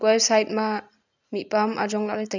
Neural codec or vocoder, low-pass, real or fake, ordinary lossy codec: none; 7.2 kHz; real; none